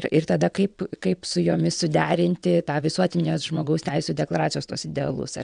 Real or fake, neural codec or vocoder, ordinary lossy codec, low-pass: fake; vocoder, 22.05 kHz, 80 mel bands, WaveNeXt; MP3, 96 kbps; 9.9 kHz